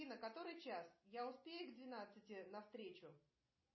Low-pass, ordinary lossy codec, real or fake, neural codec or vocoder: 7.2 kHz; MP3, 24 kbps; real; none